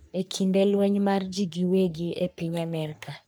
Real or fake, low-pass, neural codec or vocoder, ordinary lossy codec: fake; none; codec, 44.1 kHz, 3.4 kbps, Pupu-Codec; none